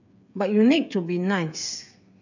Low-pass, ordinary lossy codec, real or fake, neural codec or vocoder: 7.2 kHz; none; fake; codec, 16 kHz, 8 kbps, FreqCodec, smaller model